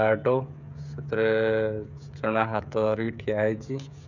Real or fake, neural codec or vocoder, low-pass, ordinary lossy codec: fake; codec, 16 kHz, 16 kbps, FreqCodec, smaller model; 7.2 kHz; none